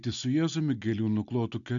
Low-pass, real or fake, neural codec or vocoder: 7.2 kHz; real; none